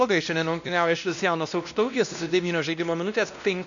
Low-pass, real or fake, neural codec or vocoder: 7.2 kHz; fake; codec, 16 kHz, 1 kbps, X-Codec, WavLM features, trained on Multilingual LibriSpeech